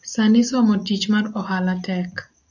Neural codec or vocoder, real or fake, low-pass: none; real; 7.2 kHz